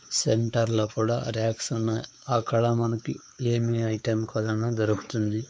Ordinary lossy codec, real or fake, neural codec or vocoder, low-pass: none; fake; codec, 16 kHz, 4 kbps, X-Codec, WavLM features, trained on Multilingual LibriSpeech; none